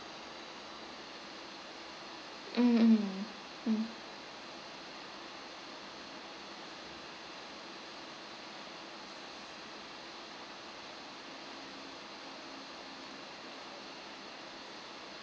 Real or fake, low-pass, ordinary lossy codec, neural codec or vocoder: real; none; none; none